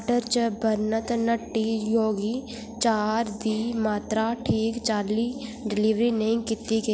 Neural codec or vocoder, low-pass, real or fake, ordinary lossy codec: none; none; real; none